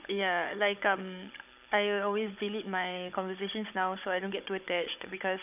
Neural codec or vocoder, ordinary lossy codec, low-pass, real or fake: codec, 16 kHz, 16 kbps, FunCodec, trained on Chinese and English, 50 frames a second; none; 3.6 kHz; fake